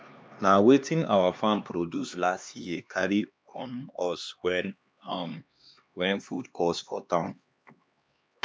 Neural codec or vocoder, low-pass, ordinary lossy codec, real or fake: codec, 16 kHz, 2 kbps, X-Codec, HuBERT features, trained on LibriSpeech; none; none; fake